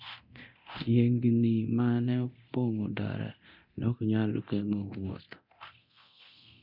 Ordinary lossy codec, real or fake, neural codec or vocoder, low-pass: none; fake; codec, 24 kHz, 0.9 kbps, DualCodec; 5.4 kHz